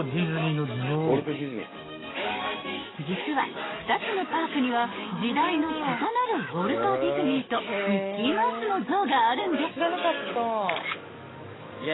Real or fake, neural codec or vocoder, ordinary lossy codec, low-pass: fake; codec, 44.1 kHz, 7.8 kbps, DAC; AAC, 16 kbps; 7.2 kHz